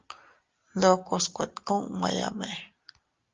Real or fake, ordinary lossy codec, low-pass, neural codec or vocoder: real; Opus, 32 kbps; 7.2 kHz; none